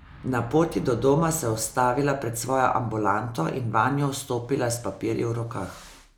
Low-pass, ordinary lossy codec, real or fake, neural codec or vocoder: none; none; real; none